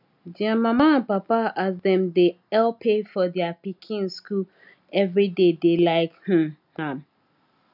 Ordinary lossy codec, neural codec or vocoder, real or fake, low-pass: none; none; real; 5.4 kHz